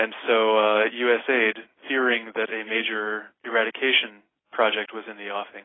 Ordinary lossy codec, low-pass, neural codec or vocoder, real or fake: AAC, 16 kbps; 7.2 kHz; none; real